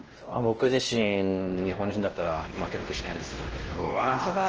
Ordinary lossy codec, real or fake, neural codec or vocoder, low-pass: Opus, 16 kbps; fake; codec, 16 kHz, 1 kbps, X-Codec, WavLM features, trained on Multilingual LibriSpeech; 7.2 kHz